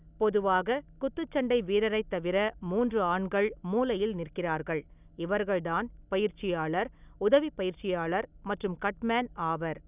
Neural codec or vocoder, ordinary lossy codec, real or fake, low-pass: none; none; real; 3.6 kHz